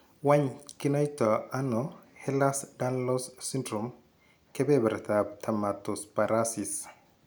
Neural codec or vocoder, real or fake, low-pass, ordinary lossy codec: none; real; none; none